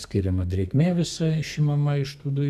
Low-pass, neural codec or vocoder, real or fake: 14.4 kHz; codec, 32 kHz, 1.9 kbps, SNAC; fake